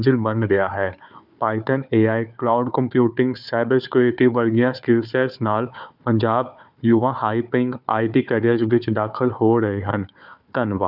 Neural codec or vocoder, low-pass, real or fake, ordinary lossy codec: codec, 16 kHz, 4 kbps, FunCodec, trained on Chinese and English, 50 frames a second; 5.4 kHz; fake; none